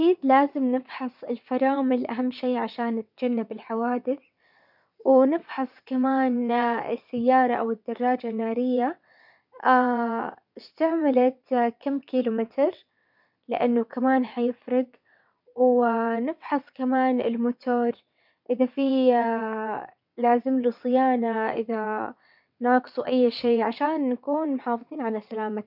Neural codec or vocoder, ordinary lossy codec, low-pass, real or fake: vocoder, 22.05 kHz, 80 mel bands, WaveNeXt; none; 5.4 kHz; fake